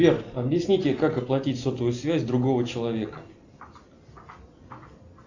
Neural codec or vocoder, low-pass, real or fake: none; 7.2 kHz; real